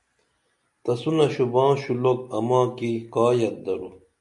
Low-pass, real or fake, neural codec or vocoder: 10.8 kHz; real; none